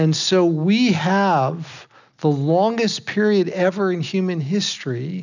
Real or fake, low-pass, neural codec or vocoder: real; 7.2 kHz; none